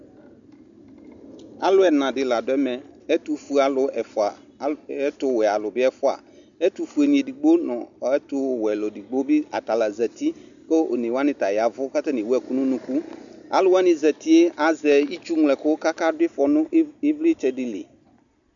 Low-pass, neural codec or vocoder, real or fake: 7.2 kHz; none; real